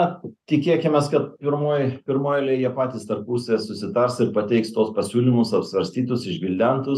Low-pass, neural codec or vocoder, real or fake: 14.4 kHz; none; real